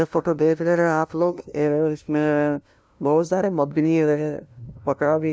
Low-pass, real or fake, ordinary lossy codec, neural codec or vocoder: none; fake; none; codec, 16 kHz, 0.5 kbps, FunCodec, trained on LibriTTS, 25 frames a second